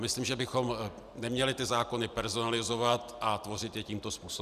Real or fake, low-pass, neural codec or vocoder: real; 14.4 kHz; none